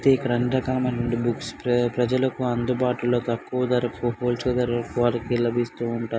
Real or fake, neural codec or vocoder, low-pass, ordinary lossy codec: real; none; none; none